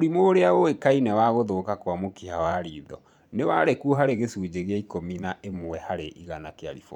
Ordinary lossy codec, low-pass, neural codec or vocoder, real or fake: none; 19.8 kHz; vocoder, 44.1 kHz, 128 mel bands every 512 samples, BigVGAN v2; fake